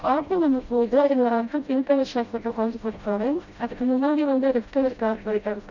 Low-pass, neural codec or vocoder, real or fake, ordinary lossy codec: 7.2 kHz; codec, 16 kHz, 0.5 kbps, FreqCodec, smaller model; fake; none